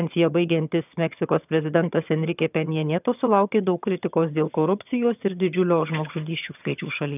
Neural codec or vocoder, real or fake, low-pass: vocoder, 22.05 kHz, 80 mel bands, HiFi-GAN; fake; 3.6 kHz